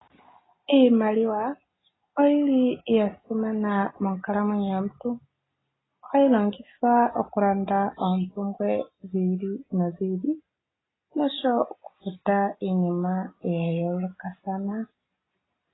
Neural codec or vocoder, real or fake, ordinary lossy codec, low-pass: none; real; AAC, 16 kbps; 7.2 kHz